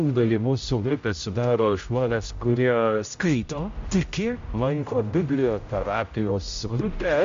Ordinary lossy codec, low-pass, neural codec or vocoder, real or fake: MP3, 48 kbps; 7.2 kHz; codec, 16 kHz, 0.5 kbps, X-Codec, HuBERT features, trained on general audio; fake